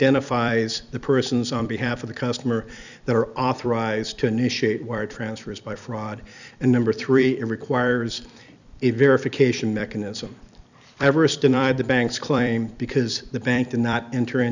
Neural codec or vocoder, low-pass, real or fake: vocoder, 44.1 kHz, 128 mel bands every 256 samples, BigVGAN v2; 7.2 kHz; fake